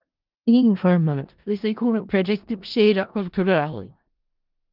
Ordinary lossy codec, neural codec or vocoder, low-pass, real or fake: Opus, 32 kbps; codec, 16 kHz in and 24 kHz out, 0.4 kbps, LongCat-Audio-Codec, four codebook decoder; 5.4 kHz; fake